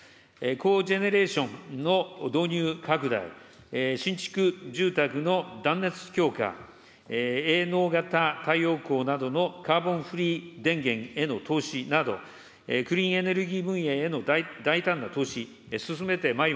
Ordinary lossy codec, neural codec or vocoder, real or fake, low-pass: none; none; real; none